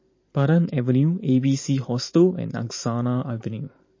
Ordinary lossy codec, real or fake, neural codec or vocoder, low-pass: MP3, 32 kbps; fake; vocoder, 44.1 kHz, 128 mel bands every 512 samples, BigVGAN v2; 7.2 kHz